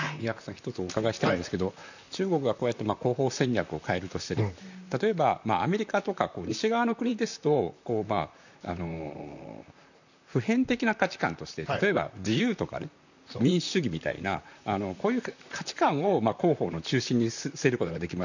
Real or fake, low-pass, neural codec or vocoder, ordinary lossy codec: fake; 7.2 kHz; vocoder, 44.1 kHz, 128 mel bands, Pupu-Vocoder; none